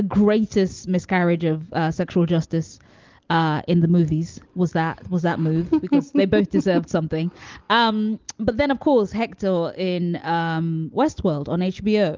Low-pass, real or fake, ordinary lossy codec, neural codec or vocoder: 7.2 kHz; real; Opus, 32 kbps; none